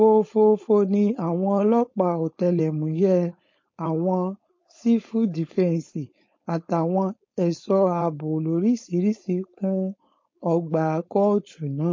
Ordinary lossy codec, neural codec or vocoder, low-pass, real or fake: MP3, 32 kbps; codec, 16 kHz, 4.8 kbps, FACodec; 7.2 kHz; fake